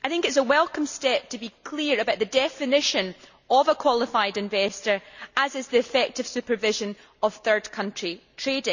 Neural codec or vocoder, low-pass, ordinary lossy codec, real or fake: none; 7.2 kHz; none; real